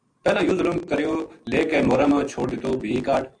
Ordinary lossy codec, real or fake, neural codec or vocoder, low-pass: AAC, 64 kbps; real; none; 9.9 kHz